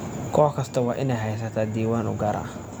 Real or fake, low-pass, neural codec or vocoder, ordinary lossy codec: real; none; none; none